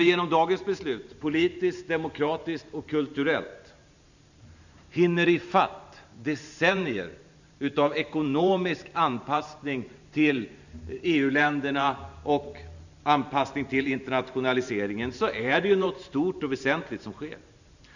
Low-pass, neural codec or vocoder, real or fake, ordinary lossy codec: 7.2 kHz; vocoder, 44.1 kHz, 128 mel bands every 512 samples, BigVGAN v2; fake; none